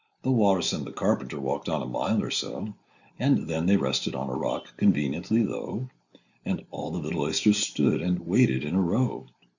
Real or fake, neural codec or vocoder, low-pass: real; none; 7.2 kHz